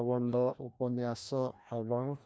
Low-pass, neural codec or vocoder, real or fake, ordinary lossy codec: none; codec, 16 kHz, 1 kbps, FreqCodec, larger model; fake; none